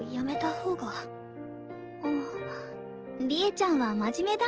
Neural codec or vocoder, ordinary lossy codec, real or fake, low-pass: none; Opus, 16 kbps; real; 7.2 kHz